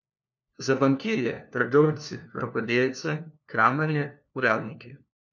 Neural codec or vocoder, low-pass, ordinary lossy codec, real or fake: codec, 16 kHz, 1 kbps, FunCodec, trained on LibriTTS, 50 frames a second; 7.2 kHz; none; fake